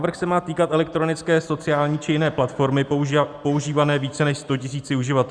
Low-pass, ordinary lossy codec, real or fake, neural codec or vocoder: 9.9 kHz; Opus, 64 kbps; real; none